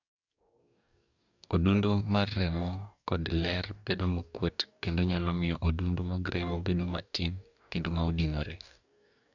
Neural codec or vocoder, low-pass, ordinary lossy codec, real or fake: codec, 44.1 kHz, 2.6 kbps, DAC; 7.2 kHz; none; fake